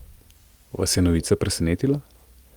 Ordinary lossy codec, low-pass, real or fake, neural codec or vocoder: Opus, 32 kbps; 19.8 kHz; real; none